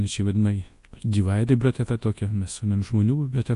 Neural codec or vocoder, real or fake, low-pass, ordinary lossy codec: codec, 24 kHz, 0.9 kbps, WavTokenizer, large speech release; fake; 10.8 kHz; AAC, 48 kbps